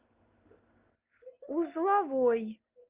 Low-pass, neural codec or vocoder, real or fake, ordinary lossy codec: 3.6 kHz; none; real; Opus, 32 kbps